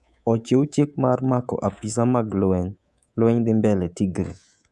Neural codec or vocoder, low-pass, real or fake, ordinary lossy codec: codec, 24 kHz, 3.1 kbps, DualCodec; none; fake; none